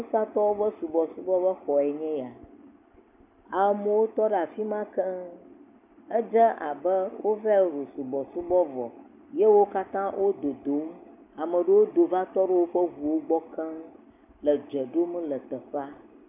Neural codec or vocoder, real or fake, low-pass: none; real; 3.6 kHz